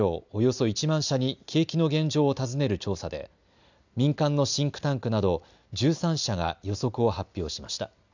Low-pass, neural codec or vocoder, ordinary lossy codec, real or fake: 7.2 kHz; none; none; real